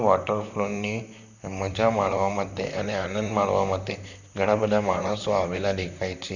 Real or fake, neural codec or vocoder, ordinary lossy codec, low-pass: fake; vocoder, 44.1 kHz, 128 mel bands, Pupu-Vocoder; none; 7.2 kHz